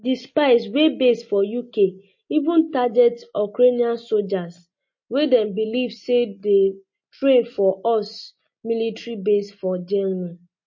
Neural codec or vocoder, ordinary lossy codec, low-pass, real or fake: none; MP3, 32 kbps; 7.2 kHz; real